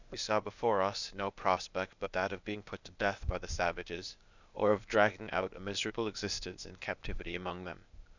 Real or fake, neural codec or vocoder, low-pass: fake; codec, 16 kHz, 0.8 kbps, ZipCodec; 7.2 kHz